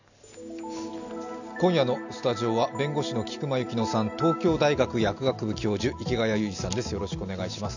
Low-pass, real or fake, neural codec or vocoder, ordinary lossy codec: 7.2 kHz; real; none; none